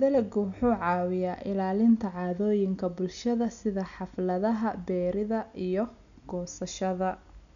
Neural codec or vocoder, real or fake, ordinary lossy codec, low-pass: none; real; none; 7.2 kHz